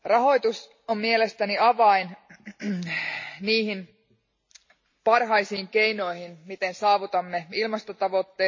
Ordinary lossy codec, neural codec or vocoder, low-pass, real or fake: MP3, 32 kbps; none; 7.2 kHz; real